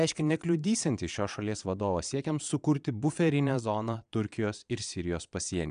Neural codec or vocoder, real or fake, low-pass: vocoder, 22.05 kHz, 80 mel bands, Vocos; fake; 9.9 kHz